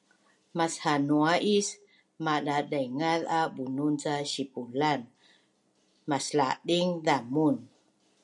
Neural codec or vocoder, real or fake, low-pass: none; real; 10.8 kHz